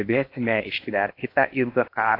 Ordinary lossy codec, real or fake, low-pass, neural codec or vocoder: AAC, 32 kbps; fake; 5.4 kHz; codec, 16 kHz in and 24 kHz out, 0.8 kbps, FocalCodec, streaming, 65536 codes